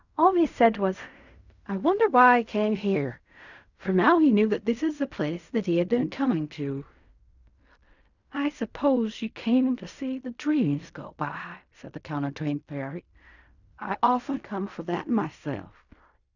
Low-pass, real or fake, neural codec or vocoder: 7.2 kHz; fake; codec, 16 kHz in and 24 kHz out, 0.4 kbps, LongCat-Audio-Codec, fine tuned four codebook decoder